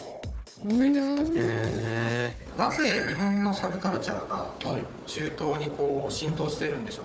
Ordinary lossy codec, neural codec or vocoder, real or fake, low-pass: none; codec, 16 kHz, 4 kbps, FunCodec, trained on Chinese and English, 50 frames a second; fake; none